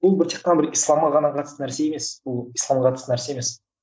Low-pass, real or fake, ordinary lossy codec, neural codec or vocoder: none; real; none; none